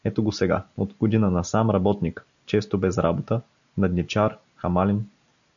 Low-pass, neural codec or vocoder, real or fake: 7.2 kHz; none; real